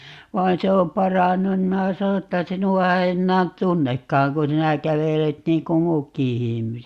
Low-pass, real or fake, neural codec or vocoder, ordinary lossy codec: 14.4 kHz; real; none; Opus, 64 kbps